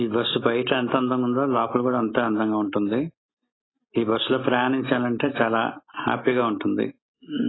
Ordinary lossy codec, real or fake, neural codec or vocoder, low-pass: AAC, 16 kbps; real; none; 7.2 kHz